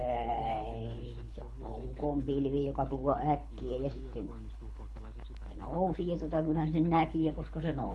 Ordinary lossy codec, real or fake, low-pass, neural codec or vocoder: none; fake; none; codec, 24 kHz, 6 kbps, HILCodec